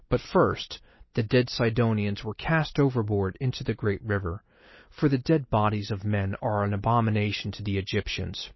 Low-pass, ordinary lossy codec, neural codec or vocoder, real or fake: 7.2 kHz; MP3, 24 kbps; none; real